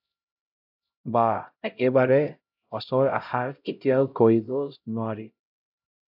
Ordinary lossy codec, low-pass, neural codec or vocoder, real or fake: AAC, 48 kbps; 5.4 kHz; codec, 16 kHz, 0.5 kbps, X-Codec, HuBERT features, trained on LibriSpeech; fake